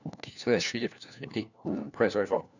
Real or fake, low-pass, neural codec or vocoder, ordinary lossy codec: fake; 7.2 kHz; codec, 16 kHz, 1 kbps, FunCodec, trained on LibriTTS, 50 frames a second; none